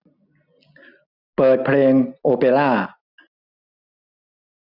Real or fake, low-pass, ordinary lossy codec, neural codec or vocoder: real; 5.4 kHz; none; none